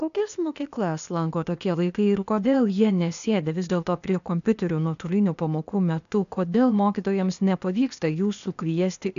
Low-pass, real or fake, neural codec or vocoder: 7.2 kHz; fake; codec, 16 kHz, 0.8 kbps, ZipCodec